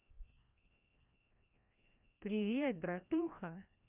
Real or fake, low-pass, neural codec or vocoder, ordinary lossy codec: fake; 3.6 kHz; codec, 16 kHz, 1 kbps, FreqCodec, larger model; none